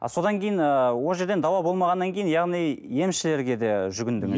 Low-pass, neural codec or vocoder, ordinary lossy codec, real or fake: none; none; none; real